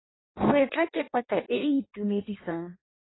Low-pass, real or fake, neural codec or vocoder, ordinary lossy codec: 7.2 kHz; fake; codec, 16 kHz in and 24 kHz out, 0.6 kbps, FireRedTTS-2 codec; AAC, 16 kbps